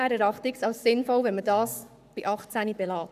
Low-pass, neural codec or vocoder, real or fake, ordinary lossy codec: 14.4 kHz; codec, 44.1 kHz, 7.8 kbps, Pupu-Codec; fake; none